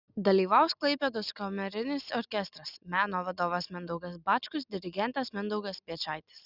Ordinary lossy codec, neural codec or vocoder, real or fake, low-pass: Opus, 64 kbps; none; real; 5.4 kHz